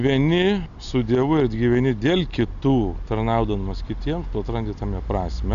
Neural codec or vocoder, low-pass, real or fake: none; 7.2 kHz; real